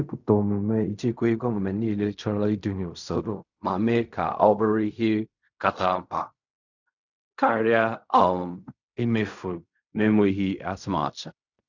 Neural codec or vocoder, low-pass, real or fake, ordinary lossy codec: codec, 16 kHz in and 24 kHz out, 0.4 kbps, LongCat-Audio-Codec, fine tuned four codebook decoder; 7.2 kHz; fake; none